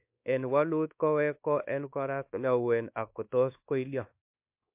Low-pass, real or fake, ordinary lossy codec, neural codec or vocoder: 3.6 kHz; fake; MP3, 32 kbps; codec, 24 kHz, 1.2 kbps, DualCodec